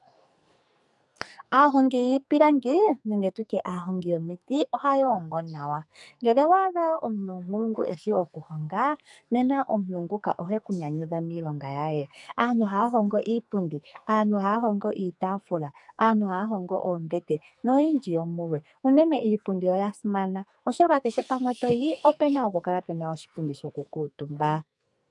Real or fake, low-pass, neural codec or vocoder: fake; 10.8 kHz; codec, 44.1 kHz, 2.6 kbps, SNAC